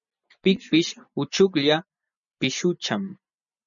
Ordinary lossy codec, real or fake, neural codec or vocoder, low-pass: MP3, 96 kbps; real; none; 7.2 kHz